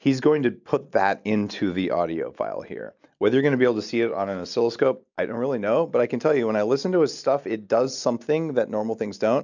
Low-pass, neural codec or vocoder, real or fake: 7.2 kHz; none; real